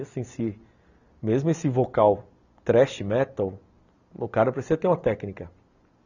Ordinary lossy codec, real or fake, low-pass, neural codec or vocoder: none; real; 7.2 kHz; none